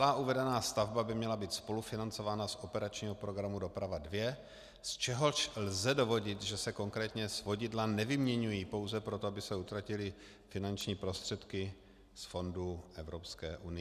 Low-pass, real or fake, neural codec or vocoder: 14.4 kHz; real; none